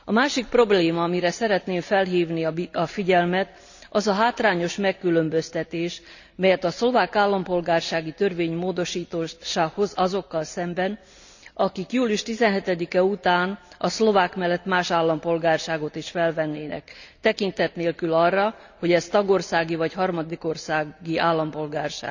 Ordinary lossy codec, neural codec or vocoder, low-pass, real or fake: none; none; 7.2 kHz; real